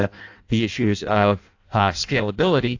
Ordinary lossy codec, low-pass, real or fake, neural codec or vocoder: AAC, 48 kbps; 7.2 kHz; fake; codec, 16 kHz in and 24 kHz out, 0.6 kbps, FireRedTTS-2 codec